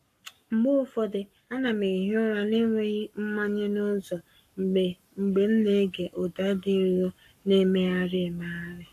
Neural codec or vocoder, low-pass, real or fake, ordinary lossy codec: codec, 44.1 kHz, 7.8 kbps, Pupu-Codec; 14.4 kHz; fake; AAC, 64 kbps